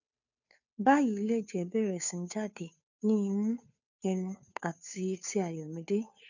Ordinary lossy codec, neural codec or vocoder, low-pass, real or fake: none; codec, 16 kHz, 2 kbps, FunCodec, trained on Chinese and English, 25 frames a second; 7.2 kHz; fake